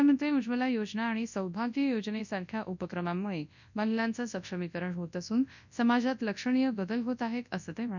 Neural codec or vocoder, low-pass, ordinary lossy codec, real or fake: codec, 24 kHz, 0.9 kbps, WavTokenizer, large speech release; 7.2 kHz; none; fake